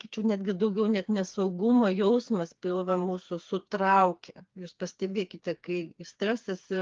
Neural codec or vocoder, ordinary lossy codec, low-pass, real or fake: codec, 16 kHz, 4 kbps, FreqCodec, smaller model; Opus, 32 kbps; 7.2 kHz; fake